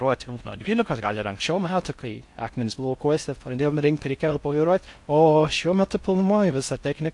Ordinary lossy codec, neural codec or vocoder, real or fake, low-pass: AAC, 64 kbps; codec, 16 kHz in and 24 kHz out, 0.6 kbps, FocalCodec, streaming, 4096 codes; fake; 10.8 kHz